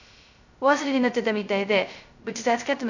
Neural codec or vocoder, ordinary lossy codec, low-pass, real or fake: codec, 16 kHz, 0.2 kbps, FocalCodec; none; 7.2 kHz; fake